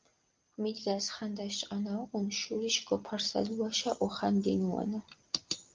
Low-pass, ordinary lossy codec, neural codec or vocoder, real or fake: 7.2 kHz; Opus, 24 kbps; none; real